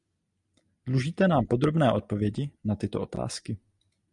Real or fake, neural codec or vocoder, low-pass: real; none; 10.8 kHz